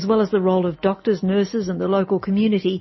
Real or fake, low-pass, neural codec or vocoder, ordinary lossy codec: real; 7.2 kHz; none; MP3, 24 kbps